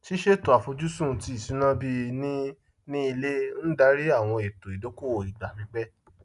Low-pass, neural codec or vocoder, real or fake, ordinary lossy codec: 10.8 kHz; none; real; none